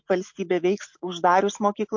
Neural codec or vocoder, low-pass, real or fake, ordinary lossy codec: none; 7.2 kHz; real; MP3, 48 kbps